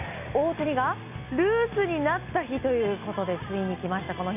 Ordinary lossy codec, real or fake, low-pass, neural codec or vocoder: none; real; 3.6 kHz; none